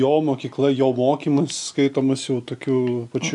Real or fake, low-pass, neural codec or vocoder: real; 10.8 kHz; none